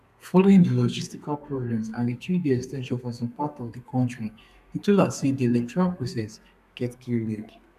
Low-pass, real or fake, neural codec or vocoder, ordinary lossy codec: 14.4 kHz; fake; codec, 32 kHz, 1.9 kbps, SNAC; none